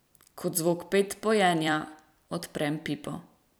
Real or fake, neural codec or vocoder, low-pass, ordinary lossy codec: fake; vocoder, 44.1 kHz, 128 mel bands every 256 samples, BigVGAN v2; none; none